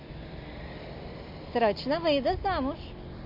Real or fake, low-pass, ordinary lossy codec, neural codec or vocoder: real; 5.4 kHz; MP3, 32 kbps; none